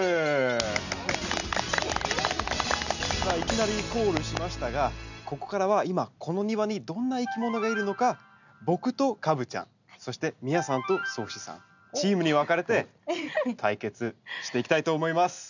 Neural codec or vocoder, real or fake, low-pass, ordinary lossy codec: none; real; 7.2 kHz; none